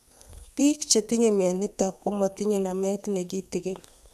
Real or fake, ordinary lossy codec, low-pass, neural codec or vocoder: fake; none; 14.4 kHz; codec, 32 kHz, 1.9 kbps, SNAC